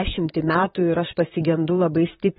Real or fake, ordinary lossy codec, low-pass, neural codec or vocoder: fake; AAC, 16 kbps; 19.8 kHz; vocoder, 44.1 kHz, 128 mel bands, Pupu-Vocoder